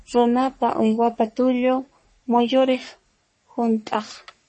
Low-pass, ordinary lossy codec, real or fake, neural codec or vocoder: 10.8 kHz; MP3, 32 kbps; fake; codec, 44.1 kHz, 3.4 kbps, Pupu-Codec